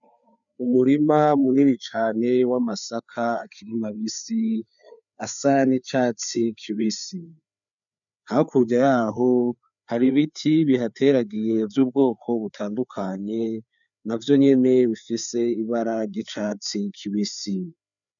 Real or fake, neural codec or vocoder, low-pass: fake; codec, 16 kHz, 4 kbps, FreqCodec, larger model; 7.2 kHz